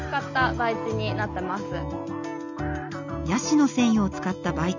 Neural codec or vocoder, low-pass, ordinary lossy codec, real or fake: none; 7.2 kHz; none; real